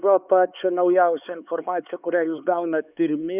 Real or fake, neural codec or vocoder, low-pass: fake; codec, 16 kHz, 4 kbps, X-Codec, HuBERT features, trained on LibriSpeech; 3.6 kHz